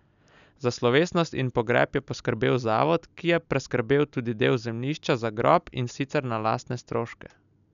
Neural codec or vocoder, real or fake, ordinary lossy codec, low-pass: none; real; none; 7.2 kHz